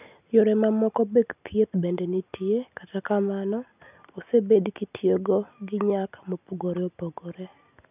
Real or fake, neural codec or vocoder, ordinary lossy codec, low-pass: real; none; none; 3.6 kHz